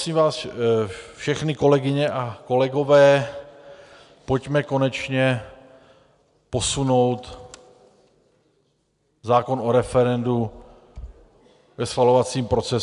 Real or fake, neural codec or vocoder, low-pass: real; none; 10.8 kHz